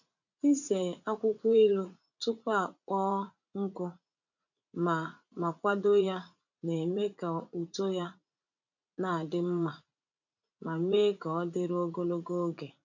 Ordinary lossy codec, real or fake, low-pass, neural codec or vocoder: none; fake; 7.2 kHz; vocoder, 44.1 kHz, 80 mel bands, Vocos